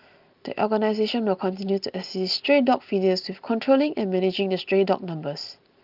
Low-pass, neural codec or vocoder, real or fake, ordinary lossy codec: 5.4 kHz; none; real; Opus, 32 kbps